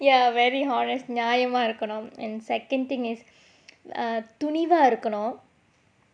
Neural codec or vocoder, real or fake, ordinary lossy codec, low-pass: none; real; none; 9.9 kHz